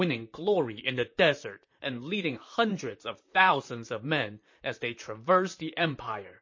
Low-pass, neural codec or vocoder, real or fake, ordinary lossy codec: 7.2 kHz; vocoder, 44.1 kHz, 128 mel bands, Pupu-Vocoder; fake; MP3, 32 kbps